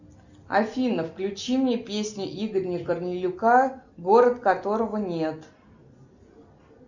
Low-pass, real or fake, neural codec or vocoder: 7.2 kHz; real; none